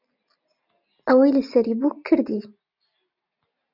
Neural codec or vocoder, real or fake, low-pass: none; real; 5.4 kHz